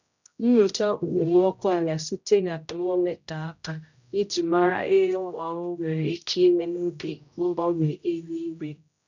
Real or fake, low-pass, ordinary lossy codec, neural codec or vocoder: fake; 7.2 kHz; none; codec, 16 kHz, 0.5 kbps, X-Codec, HuBERT features, trained on general audio